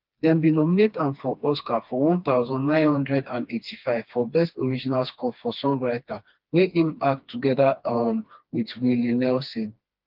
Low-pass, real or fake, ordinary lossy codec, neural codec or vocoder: 5.4 kHz; fake; Opus, 24 kbps; codec, 16 kHz, 2 kbps, FreqCodec, smaller model